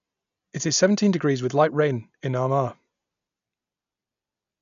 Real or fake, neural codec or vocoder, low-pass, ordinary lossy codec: real; none; 7.2 kHz; MP3, 96 kbps